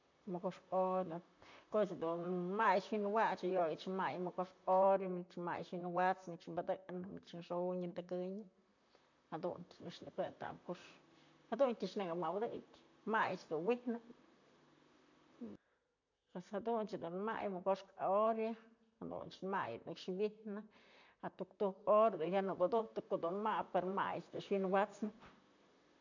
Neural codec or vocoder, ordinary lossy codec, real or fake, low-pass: vocoder, 44.1 kHz, 128 mel bands, Pupu-Vocoder; none; fake; 7.2 kHz